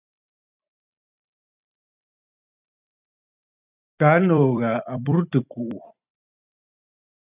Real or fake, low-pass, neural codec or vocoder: fake; 3.6 kHz; vocoder, 44.1 kHz, 128 mel bands every 256 samples, BigVGAN v2